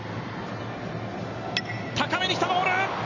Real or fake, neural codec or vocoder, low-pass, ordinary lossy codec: real; none; 7.2 kHz; none